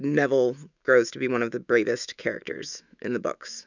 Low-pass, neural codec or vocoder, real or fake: 7.2 kHz; autoencoder, 48 kHz, 128 numbers a frame, DAC-VAE, trained on Japanese speech; fake